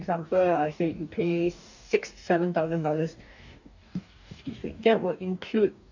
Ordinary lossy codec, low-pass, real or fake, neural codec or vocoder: none; 7.2 kHz; fake; codec, 44.1 kHz, 2.6 kbps, DAC